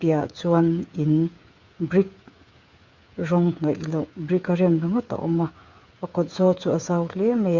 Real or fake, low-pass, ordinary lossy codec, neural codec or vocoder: fake; 7.2 kHz; Opus, 64 kbps; codec, 16 kHz, 8 kbps, FreqCodec, smaller model